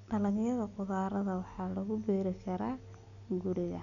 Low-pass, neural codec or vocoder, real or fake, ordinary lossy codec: 7.2 kHz; none; real; none